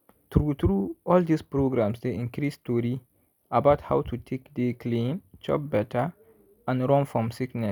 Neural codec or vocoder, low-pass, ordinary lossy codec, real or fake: none; none; none; real